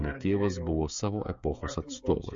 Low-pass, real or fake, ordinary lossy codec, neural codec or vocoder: 7.2 kHz; fake; MP3, 48 kbps; codec, 16 kHz, 16 kbps, FreqCodec, smaller model